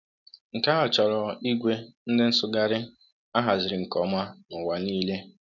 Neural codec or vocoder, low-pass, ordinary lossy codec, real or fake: none; 7.2 kHz; none; real